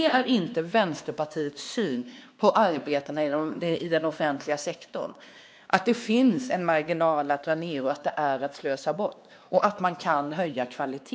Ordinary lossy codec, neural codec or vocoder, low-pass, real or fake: none; codec, 16 kHz, 2 kbps, X-Codec, WavLM features, trained on Multilingual LibriSpeech; none; fake